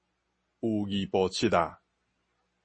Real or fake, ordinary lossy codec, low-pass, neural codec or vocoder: real; MP3, 32 kbps; 9.9 kHz; none